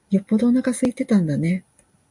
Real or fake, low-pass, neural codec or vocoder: real; 10.8 kHz; none